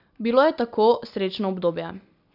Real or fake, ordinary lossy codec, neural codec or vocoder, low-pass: real; none; none; 5.4 kHz